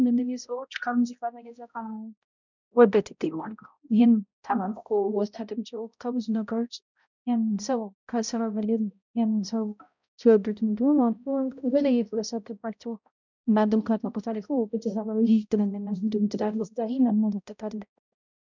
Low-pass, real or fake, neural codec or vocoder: 7.2 kHz; fake; codec, 16 kHz, 0.5 kbps, X-Codec, HuBERT features, trained on balanced general audio